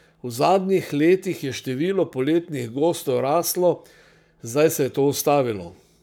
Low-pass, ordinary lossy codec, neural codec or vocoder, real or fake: none; none; codec, 44.1 kHz, 7.8 kbps, Pupu-Codec; fake